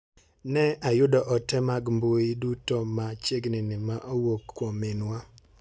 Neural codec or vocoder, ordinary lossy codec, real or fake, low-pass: none; none; real; none